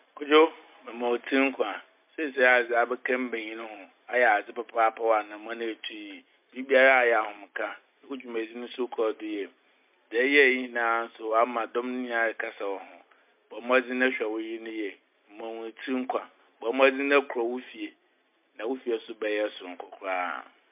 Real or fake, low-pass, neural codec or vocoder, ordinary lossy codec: real; 3.6 kHz; none; MP3, 32 kbps